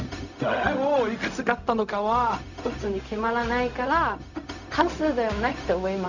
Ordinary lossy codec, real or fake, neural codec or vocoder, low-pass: none; fake; codec, 16 kHz, 0.4 kbps, LongCat-Audio-Codec; 7.2 kHz